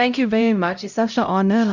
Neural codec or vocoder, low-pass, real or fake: codec, 16 kHz, 0.5 kbps, X-Codec, HuBERT features, trained on LibriSpeech; 7.2 kHz; fake